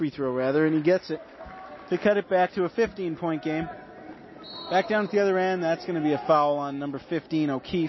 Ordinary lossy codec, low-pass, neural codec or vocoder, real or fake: MP3, 24 kbps; 7.2 kHz; none; real